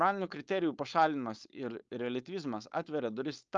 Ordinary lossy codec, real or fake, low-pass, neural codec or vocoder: Opus, 24 kbps; fake; 7.2 kHz; codec, 16 kHz, 4.8 kbps, FACodec